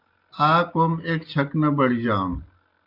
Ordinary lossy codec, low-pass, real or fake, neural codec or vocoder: Opus, 24 kbps; 5.4 kHz; real; none